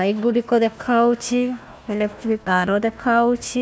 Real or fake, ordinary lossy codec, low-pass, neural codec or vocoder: fake; none; none; codec, 16 kHz, 1 kbps, FunCodec, trained on Chinese and English, 50 frames a second